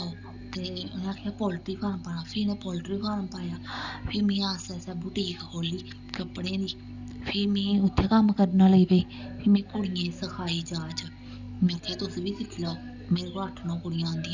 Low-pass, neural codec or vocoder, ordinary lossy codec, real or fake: 7.2 kHz; none; none; real